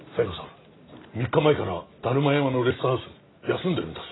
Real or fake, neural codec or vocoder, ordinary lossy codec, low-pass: real; none; AAC, 16 kbps; 7.2 kHz